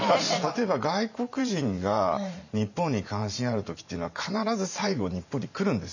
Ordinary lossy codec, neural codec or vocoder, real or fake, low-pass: none; vocoder, 44.1 kHz, 128 mel bands every 512 samples, BigVGAN v2; fake; 7.2 kHz